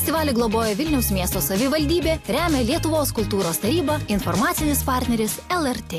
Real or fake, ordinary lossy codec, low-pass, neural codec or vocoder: real; AAC, 64 kbps; 14.4 kHz; none